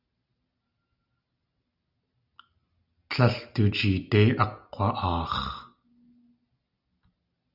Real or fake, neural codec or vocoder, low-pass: real; none; 5.4 kHz